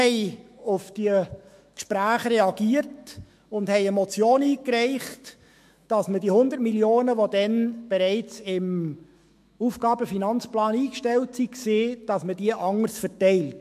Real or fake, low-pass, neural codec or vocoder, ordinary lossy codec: fake; 14.4 kHz; autoencoder, 48 kHz, 128 numbers a frame, DAC-VAE, trained on Japanese speech; MP3, 64 kbps